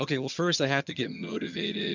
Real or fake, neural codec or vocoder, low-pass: fake; vocoder, 22.05 kHz, 80 mel bands, HiFi-GAN; 7.2 kHz